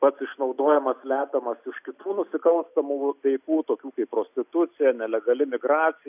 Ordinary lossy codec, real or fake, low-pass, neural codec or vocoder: AAC, 32 kbps; real; 3.6 kHz; none